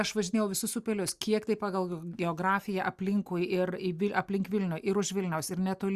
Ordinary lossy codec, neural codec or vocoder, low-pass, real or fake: Opus, 64 kbps; none; 14.4 kHz; real